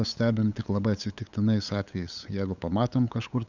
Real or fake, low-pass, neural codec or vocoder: fake; 7.2 kHz; codec, 16 kHz, 8 kbps, FunCodec, trained on LibriTTS, 25 frames a second